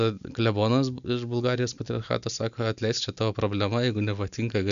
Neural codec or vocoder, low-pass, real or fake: none; 7.2 kHz; real